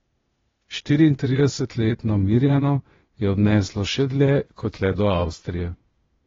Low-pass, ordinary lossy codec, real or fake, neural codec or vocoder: 7.2 kHz; AAC, 24 kbps; fake; codec, 16 kHz, 0.8 kbps, ZipCodec